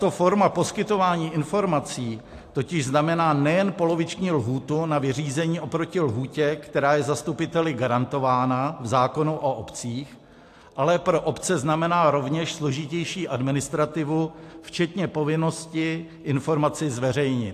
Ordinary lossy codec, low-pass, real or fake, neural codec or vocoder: AAC, 64 kbps; 14.4 kHz; real; none